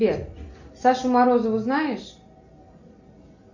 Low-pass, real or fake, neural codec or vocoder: 7.2 kHz; real; none